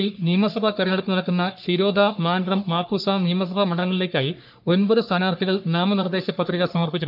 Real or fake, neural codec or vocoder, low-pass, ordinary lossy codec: fake; codec, 44.1 kHz, 3.4 kbps, Pupu-Codec; 5.4 kHz; none